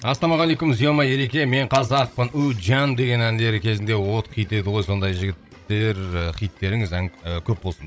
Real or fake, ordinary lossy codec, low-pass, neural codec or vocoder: fake; none; none; codec, 16 kHz, 16 kbps, FreqCodec, larger model